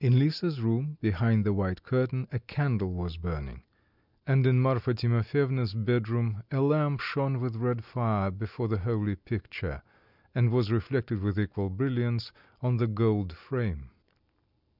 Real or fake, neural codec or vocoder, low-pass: real; none; 5.4 kHz